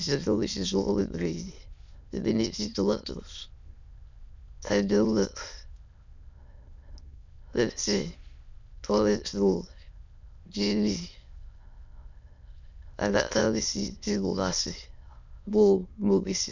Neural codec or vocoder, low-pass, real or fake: autoencoder, 22.05 kHz, a latent of 192 numbers a frame, VITS, trained on many speakers; 7.2 kHz; fake